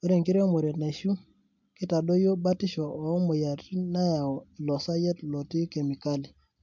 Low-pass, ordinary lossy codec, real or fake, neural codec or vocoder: 7.2 kHz; MP3, 64 kbps; real; none